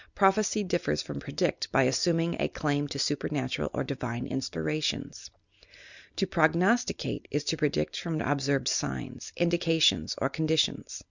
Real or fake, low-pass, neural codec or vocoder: real; 7.2 kHz; none